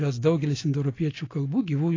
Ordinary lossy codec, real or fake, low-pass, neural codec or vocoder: AAC, 32 kbps; real; 7.2 kHz; none